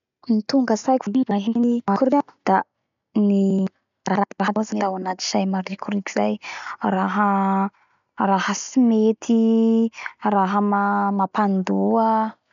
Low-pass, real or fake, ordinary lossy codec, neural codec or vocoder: 7.2 kHz; real; none; none